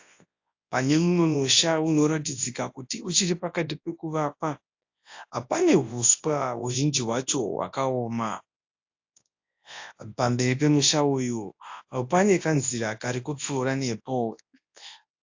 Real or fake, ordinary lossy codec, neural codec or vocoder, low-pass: fake; AAC, 48 kbps; codec, 24 kHz, 0.9 kbps, WavTokenizer, large speech release; 7.2 kHz